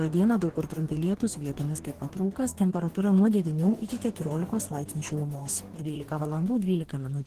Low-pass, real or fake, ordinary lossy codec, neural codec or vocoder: 14.4 kHz; fake; Opus, 16 kbps; codec, 44.1 kHz, 2.6 kbps, DAC